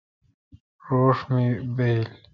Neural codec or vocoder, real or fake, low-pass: none; real; 7.2 kHz